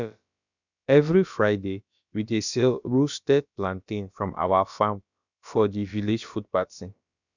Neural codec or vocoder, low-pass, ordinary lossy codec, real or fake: codec, 16 kHz, about 1 kbps, DyCAST, with the encoder's durations; 7.2 kHz; none; fake